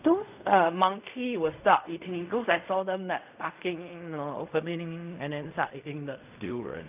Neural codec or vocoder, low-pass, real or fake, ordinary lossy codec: codec, 16 kHz in and 24 kHz out, 0.4 kbps, LongCat-Audio-Codec, fine tuned four codebook decoder; 3.6 kHz; fake; none